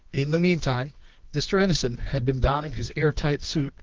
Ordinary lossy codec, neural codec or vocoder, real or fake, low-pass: Opus, 32 kbps; codec, 24 kHz, 0.9 kbps, WavTokenizer, medium music audio release; fake; 7.2 kHz